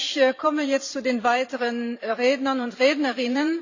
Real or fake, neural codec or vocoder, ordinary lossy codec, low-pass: fake; vocoder, 44.1 kHz, 128 mel bands every 512 samples, BigVGAN v2; AAC, 48 kbps; 7.2 kHz